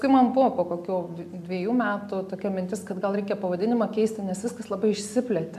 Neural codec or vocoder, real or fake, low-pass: none; real; 14.4 kHz